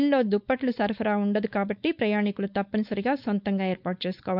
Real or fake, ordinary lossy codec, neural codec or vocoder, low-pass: fake; none; codec, 16 kHz, 4.8 kbps, FACodec; 5.4 kHz